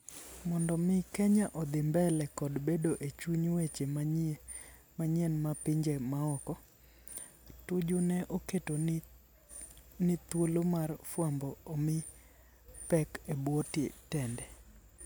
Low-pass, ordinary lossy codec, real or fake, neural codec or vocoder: none; none; real; none